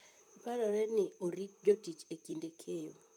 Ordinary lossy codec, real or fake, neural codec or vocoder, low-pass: none; fake; vocoder, 44.1 kHz, 128 mel bands, Pupu-Vocoder; 19.8 kHz